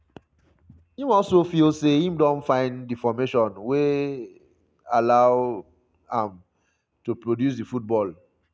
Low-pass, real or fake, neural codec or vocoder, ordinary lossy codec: none; real; none; none